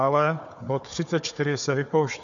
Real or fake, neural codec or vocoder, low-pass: fake; codec, 16 kHz, 4 kbps, FunCodec, trained on Chinese and English, 50 frames a second; 7.2 kHz